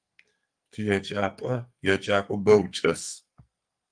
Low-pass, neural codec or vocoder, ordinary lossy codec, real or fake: 9.9 kHz; codec, 44.1 kHz, 2.6 kbps, SNAC; Opus, 32 kbps; fake